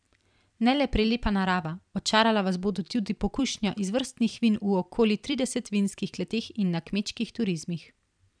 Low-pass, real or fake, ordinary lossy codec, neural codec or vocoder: 9.9 kHz; fake; none; vocoder, 44.1 kHz, 128 mel bands every 256 samples, BigVGAN v2